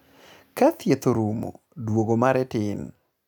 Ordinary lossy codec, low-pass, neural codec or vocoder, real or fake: none; none; none; real